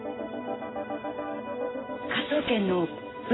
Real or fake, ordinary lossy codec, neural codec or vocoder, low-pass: real; AAC, 16 kbps; none; 7.2 kHz